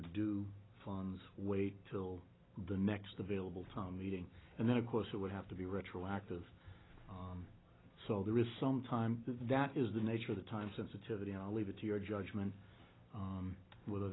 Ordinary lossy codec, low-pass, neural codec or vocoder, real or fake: AAC, 16 kbps; 7.2 kHz; none; real